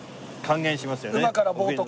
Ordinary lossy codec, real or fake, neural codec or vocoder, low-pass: none; real; none; none